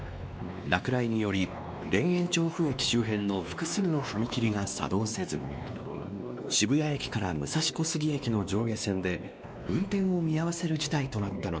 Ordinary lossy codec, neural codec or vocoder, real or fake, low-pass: none; codec, 16 kHz, 2 kbps, X-Codec, WavLM features, trained on Multilingual LibriSpeech; fake; none